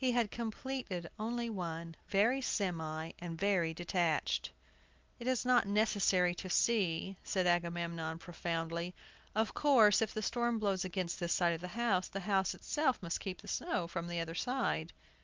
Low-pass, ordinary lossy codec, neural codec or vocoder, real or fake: 7.2 kHz; Opus, 32 kbps; none; real